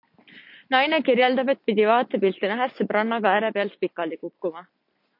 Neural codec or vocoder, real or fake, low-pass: none; real; 5.4 kHz